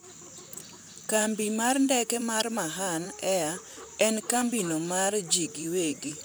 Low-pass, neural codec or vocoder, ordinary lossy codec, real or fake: none; vocoder, 44.1 kHz, 128 mel bands, Pupu-Vocoder; none; fake